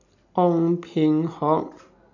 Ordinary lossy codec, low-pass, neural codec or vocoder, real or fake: none; 7.2 kHz; none; real